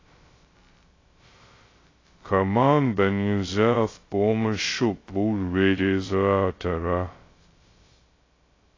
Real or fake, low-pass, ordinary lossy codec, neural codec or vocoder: fake; 7.2 kHz; AAC, 32 kbps; codec, 16 kHz, 0.2 kbps, FocalCodec